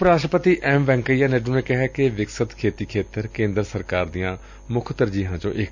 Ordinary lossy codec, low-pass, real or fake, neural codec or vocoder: none; 7.2 kHz; real; none